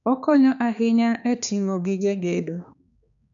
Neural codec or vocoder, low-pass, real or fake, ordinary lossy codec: codec, 16 kHz, 2 kbps, X-Codec, HuBERT features, trained on balanced general audio; 7.2 kHz; fake; none